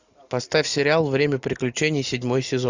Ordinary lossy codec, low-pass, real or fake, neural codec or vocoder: Opus, 64 kbps; 7.2 kHz; real; none